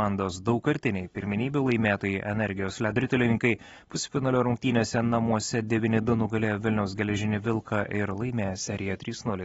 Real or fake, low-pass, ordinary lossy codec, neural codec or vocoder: real; 10.8 kHz; AAC, 24 kbps; none